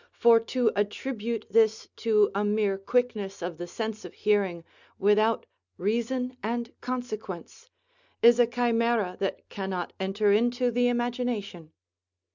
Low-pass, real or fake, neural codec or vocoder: 7.2 kHz; real; none